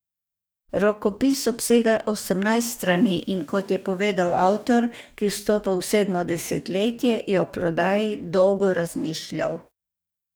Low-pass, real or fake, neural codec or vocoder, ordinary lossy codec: none; fake; codec, 44.1 kHz, 2.6 kbps, DAC; none